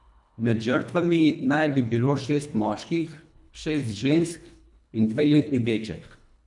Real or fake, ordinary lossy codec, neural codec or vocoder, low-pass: fake; none; codec, 24 kHz, 1.5 kbps, HILCodec; 10.8 kHz